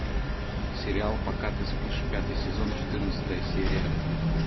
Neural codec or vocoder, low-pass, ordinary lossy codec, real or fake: none; 7.2 kHz; MP3, 24 kbps; real